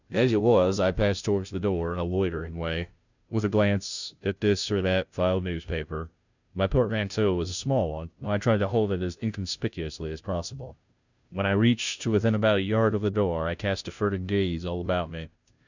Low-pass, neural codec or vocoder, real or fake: 7.2 kHz; codec, 16 kHz, 0.5 kbps, FunCodec, trained on Chinese and English, 25 frames a second; fake